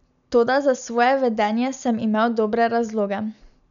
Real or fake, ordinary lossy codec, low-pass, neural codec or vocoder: real; none; 7.2 kHz; none